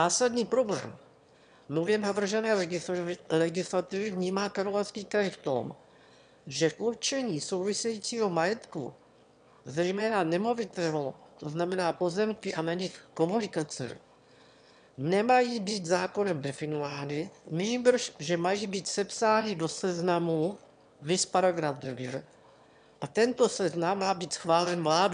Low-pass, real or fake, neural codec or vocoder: 9.9 kHz; fake; autoencoder, 22.05 kHz, a latent of 192 numbers a frame, VITS, trained on one speaker